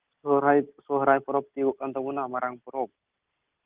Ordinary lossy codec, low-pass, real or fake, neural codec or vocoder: Opus, 32 kbps; 3.6 kHz; real; none